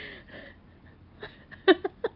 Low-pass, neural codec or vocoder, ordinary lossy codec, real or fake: 5.4 kHz; none; Opus, 24 kbps; real